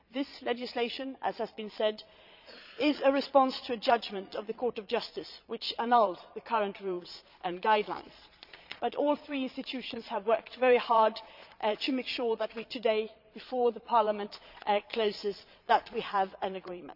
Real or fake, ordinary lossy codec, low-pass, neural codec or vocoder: fake; none; 5.4 kHz; vocoder, 22.05 kHz, 80 mel bands, Vocos